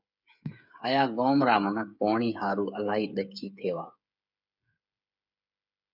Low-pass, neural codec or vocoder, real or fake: 5.4 kHz; codec, 16 kHz, 8 kbps, FreqCodec, smaller model; fake